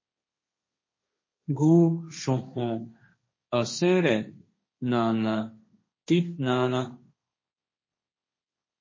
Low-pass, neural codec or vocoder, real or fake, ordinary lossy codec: 7.2 kHz; codec, 16 kHz, 1.1 kbps, Voila-Tokenizer; fake; MP3, 32 kbps